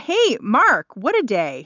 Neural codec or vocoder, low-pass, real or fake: none; 7.2 kHz; real